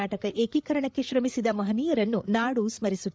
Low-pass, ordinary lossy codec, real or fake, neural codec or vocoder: none; none; fake; codec, 16 kHz, 8 kbps, FreqCodec, larger model